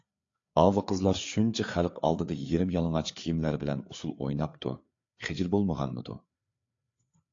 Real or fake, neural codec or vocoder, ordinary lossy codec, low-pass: fake; codec, 16 kHz, 4 kbps, FreqCodec, larger model; AAC, 48 kbps; 7.2 kHz